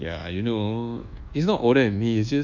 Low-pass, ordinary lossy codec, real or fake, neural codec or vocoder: 7.2 kHz; none; fake; codec, 24 kHz, 1.2 kbps, DualCodec